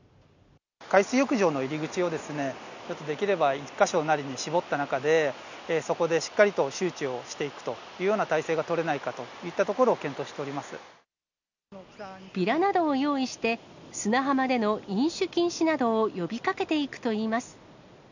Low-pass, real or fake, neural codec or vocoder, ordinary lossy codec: 7.2 kHz; real; none; none